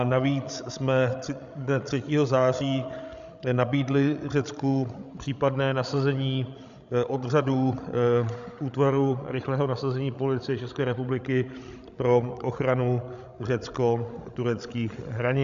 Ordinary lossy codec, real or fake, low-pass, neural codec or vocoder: AAC, 96 kbps; fake; 7.2 kHz; codec, 16 kHz, 16 kbps, FreqCodec, larger model